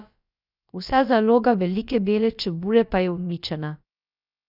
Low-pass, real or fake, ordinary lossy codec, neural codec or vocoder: 5.4 kHz; fake; none; codec, 16 kHz, about 1 kbps, DyCAST, with the encoder's durations